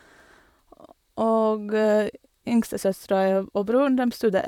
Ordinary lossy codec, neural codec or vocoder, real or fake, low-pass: none; vocoder, 44.1 kHz, 128 mel bands, Pupu-Vocoder; fake; 19.8 kHz